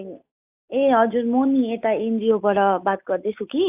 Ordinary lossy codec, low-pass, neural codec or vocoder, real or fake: AAC, 32 kbps; 3.6 kHz; none; real